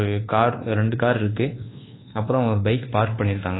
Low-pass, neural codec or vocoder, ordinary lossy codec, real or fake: 7.2 kHz; codec, 24 kHz, 1.2 kbps, DualCodec; AAC, 16 kbps; fake